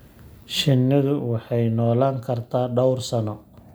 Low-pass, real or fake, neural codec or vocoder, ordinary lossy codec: none; real; none; none